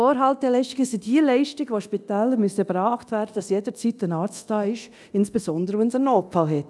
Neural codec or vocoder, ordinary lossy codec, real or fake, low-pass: codec, 24 kHz, 0.9 kbps, DualCodec; none; fake; none